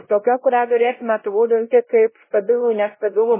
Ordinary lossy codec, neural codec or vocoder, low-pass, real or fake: MP3, 16 kbps; codec, 16 kHz, 0.5 kbps, X-Codec, WavLM features, trained on Multilingual LibriSpeech; 3.6 kHz; fake